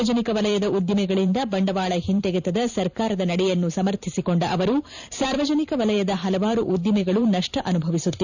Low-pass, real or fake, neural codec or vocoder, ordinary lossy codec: 7.2 kHz; fake; vocoder, 44.1 kHz, 128 mel bands every 512 samples, BigVGAN v2; none